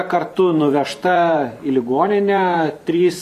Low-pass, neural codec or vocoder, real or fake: 14.4 kHz; vocoder, 44.1 kHz, 128 mel bands every 512 samples, BigVGAN v2; fake